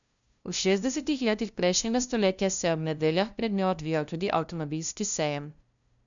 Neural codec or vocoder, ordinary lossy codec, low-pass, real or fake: codec, 16 kHz, 0.5 kbps, FunCodec, trained on LibriTTS, 25 frames a second; none; 7.2 kHz; fake